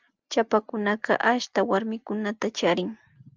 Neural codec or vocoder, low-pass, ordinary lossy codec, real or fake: none; 7.2 kHz; Opus, 32 kbps; real